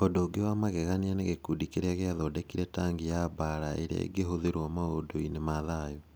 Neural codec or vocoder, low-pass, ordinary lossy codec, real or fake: none; none; none; real